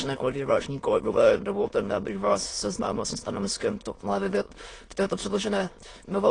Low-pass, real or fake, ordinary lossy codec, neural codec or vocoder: 9.9 kHz; fake; AAC, 32 kbps; autoencoder, 22.05 kHz, a latent of 192 numbers a frame, VITS, trained on many speakers